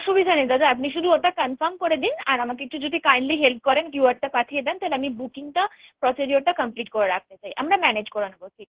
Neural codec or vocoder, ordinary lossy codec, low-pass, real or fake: codec, 16 kHz in and 24 kHz out, 1 kbps, XY-Tokenizer; Opus, 16 kbps; 3.6 kHz; fake